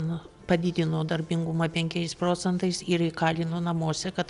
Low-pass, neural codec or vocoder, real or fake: 10.8 kHz; none; real